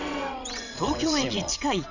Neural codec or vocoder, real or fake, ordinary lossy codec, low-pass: vocoder, 22.05 kHz, 80 mel bands, WaveNeXt; fake; none; 7.2 kHz